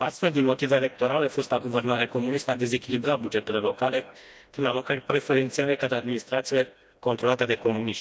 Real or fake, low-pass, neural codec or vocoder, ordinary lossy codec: fake; none; codec, 16 kHz, 1 kbps, FreqCodec, smaller model; none